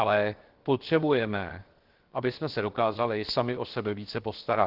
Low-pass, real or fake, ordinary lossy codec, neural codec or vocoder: 5.4 kHz; fake; Opus, 16 kbps; codec, 16 kHz, 0.7 kbps, FocalCodec